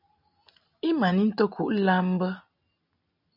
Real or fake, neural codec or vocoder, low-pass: real; none; 5.4 kHz